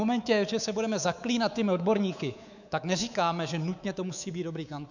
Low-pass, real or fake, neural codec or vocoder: 7.2 kHz; fake; codec, 24 kHz, 3.1 kbps, DualCodec